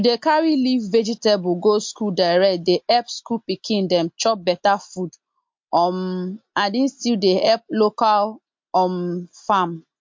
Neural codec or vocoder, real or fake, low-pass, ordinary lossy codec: none; real; 7.2 kHz; MP3, 48 kbps